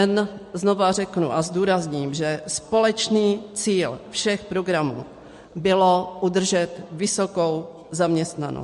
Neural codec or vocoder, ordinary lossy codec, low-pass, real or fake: none; MP3, 48 kbps; 14.4 kHz; real